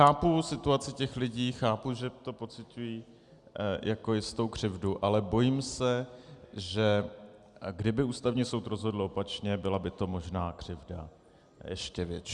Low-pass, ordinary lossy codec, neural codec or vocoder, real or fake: 10.8 kHz; Opus, 64 kbps; none; real